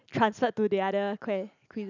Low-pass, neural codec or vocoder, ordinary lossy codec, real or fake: 7.2 kHz; none; none; real